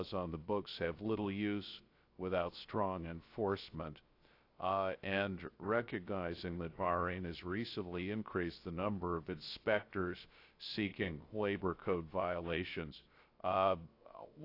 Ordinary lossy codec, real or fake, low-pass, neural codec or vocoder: AAC, 32 kbps; fake; 5.4 kHz; codec, 16 kHz, 0.3 kbps, FocalCodec